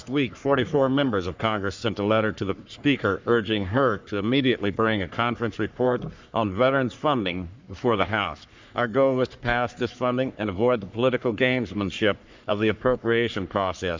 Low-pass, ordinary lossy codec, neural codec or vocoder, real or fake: 7.2 kHz; MP3, 64 kbps; codec, 44.1 kHz, 3.4 kbps, Pupu-Codec; fake